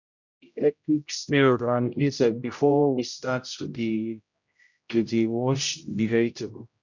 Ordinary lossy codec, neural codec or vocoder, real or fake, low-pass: none; codec, 16 kHz, 0.5 kbps, X-Codec, HuBERT features, trained on general audio; fake; 7.2 kHz